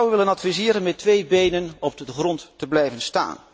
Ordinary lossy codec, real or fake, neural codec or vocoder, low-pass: none; real; none; none